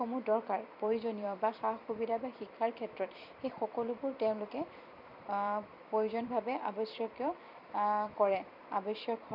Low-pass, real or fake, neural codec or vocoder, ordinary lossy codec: 5.4 kHz; fake; vocoder, 44.1 kHz, 128 mel bands every 256 samples, BigVGAN v2; none